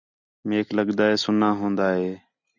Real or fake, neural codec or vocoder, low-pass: real; none; 7.2 kHz